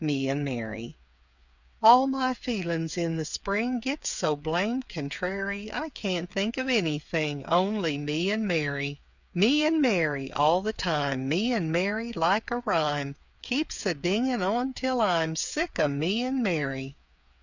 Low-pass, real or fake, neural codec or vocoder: 7.2 kHz; fake; codec, 16 kHz, 8 kbps, FreqCodec, smaller model